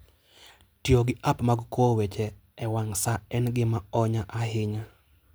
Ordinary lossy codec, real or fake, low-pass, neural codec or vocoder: none; real; none; none